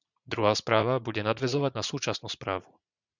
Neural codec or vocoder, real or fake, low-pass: vocoder, 44.1 kHz, 80 mel bands, Vocos; fake; 7.2 kHz